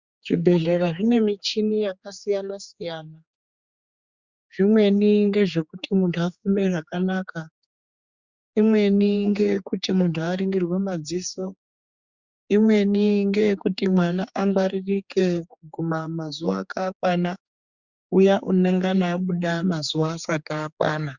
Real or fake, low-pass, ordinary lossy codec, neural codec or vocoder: fake; 7.2 kHz; Opus, 64 kbps; codec, 16 kHz, 4 kbps, X-Codec, HuBERT features, trained on general audio